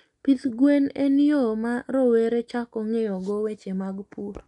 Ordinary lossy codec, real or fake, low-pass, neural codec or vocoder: none; real; 10.8 kHz; none